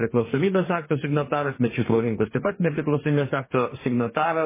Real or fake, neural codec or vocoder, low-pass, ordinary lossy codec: fake; codec, 44.1 kHz, 2.6 kbps, DAC; 3.6 kHz; MP3, 16 kbps